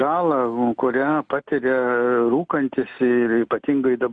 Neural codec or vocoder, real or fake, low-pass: none; real; 9.9 kHz